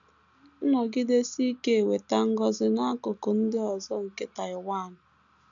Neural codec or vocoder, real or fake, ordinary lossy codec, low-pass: none; real; none; 7.2 kHz